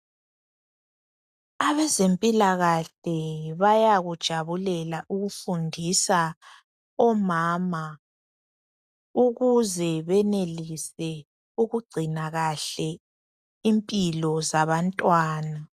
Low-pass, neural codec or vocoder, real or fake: 14.4 kHz; none; real